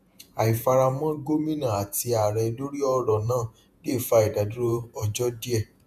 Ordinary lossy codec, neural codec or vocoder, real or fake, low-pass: none; vocoder, 48 kHz, 128 mel bands, Vocos; fake; 14.4 kHz